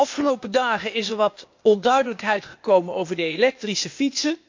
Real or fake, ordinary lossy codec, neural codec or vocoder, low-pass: fake; MP3, 64 kbps; codec, 16 kHz, 0.8 kbps, ZipCodec; 7.2 kHz